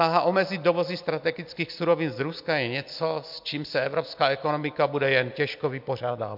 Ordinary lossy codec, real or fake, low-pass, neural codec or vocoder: MP3, 48 kbps; real; 5.4 kHz; none